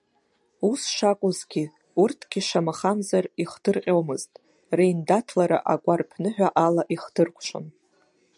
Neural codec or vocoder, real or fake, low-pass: none; real; 10.8 kHz